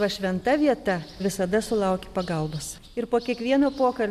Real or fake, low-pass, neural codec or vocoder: real; 14.4 kHz; none